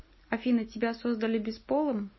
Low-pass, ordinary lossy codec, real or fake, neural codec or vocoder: 7.2 kHz; MP3, 24 kbps; real; none